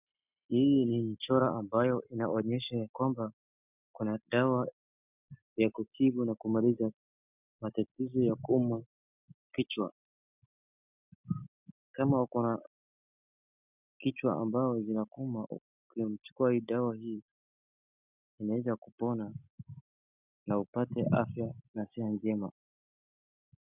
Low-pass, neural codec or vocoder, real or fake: 3.6 kHz; none; real